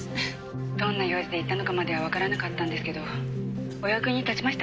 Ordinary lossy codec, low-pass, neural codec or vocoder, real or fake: none; none; none; real